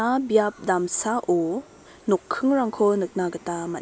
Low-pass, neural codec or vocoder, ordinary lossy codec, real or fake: none; none; none; real